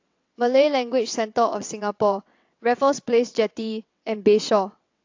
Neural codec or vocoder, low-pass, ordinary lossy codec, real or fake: vocoder, 22.05 kHz, 80 mel bands, WaveNeXt; 7.2 kHz; AAC, 48 kbps; fake